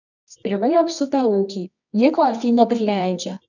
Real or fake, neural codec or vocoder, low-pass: fake; codec, 24 kHz, 0.9 kbps, WavTokenizer, medium music audio release; 7.2 kHz